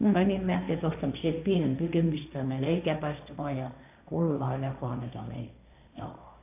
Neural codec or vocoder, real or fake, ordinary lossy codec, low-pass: codec, 16 kHz, 1.1 kbps, Voila-Tokenizer; fake; AAC, 32 kbps; 3.6 kHz